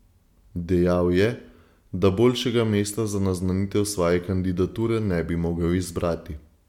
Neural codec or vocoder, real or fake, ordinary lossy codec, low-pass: none; real; MP3, 96 kbps; 19.8 kHz